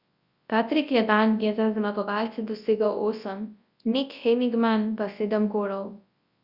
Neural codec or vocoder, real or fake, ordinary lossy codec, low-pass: codec, 24 kHz, 0.9 kbps, WavTokenizer, large speech release; fake; Opus, 64 kbps; 5.4 kHz